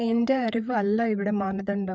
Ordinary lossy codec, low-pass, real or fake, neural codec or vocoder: none; none; fake; codec, 16 kHz, 4 kbps, FreqCodec, larger model